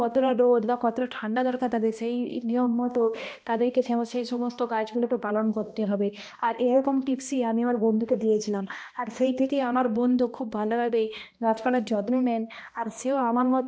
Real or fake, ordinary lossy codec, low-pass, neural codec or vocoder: fake; none; none; codec, 16 kHz, 1 kbps, X-Codec, HuBERT features, trained on balanced general audio